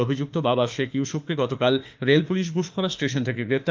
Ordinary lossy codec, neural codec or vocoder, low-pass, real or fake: Opus, 32 kbps; autoencoder, 48 kHz, 32 numbers a frame, DAC-VAE, trained on Japanese speech; 7.2 kHz; fake